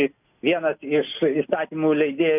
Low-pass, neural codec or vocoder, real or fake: 3.6 kHz; none; real